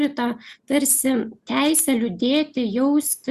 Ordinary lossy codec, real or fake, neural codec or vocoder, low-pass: Opus, 64 kbps; real; none; 14.4 kHz